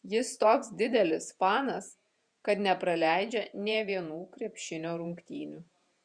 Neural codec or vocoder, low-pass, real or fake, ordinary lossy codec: vocoder, 48 kHz, 128 mel bands, Vocos; 9.9 kHz; fake; Opus, 64 kbps